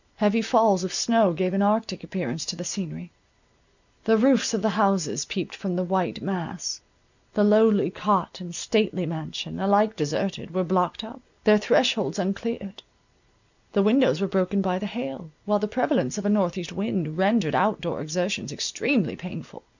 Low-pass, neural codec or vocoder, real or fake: 7.2 kHz; none; real